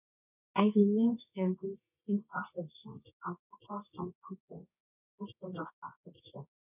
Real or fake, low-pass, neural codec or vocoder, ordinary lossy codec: fake; 3.6 kHz; codec, 24 kHz, 0.9 kbps, WavTokenizer, medium music audio release; none